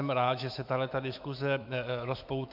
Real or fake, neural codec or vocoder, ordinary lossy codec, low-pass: fake; autoencoder, 48 kHz, 128 numbers a frame, DAC-VAE, trained on Japanese speech; MP3, 48 kbps; 5.4 kHz